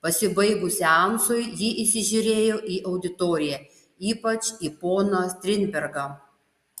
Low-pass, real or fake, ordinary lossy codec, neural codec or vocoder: 14.4 kHz; real; Opus, 64 kbps; none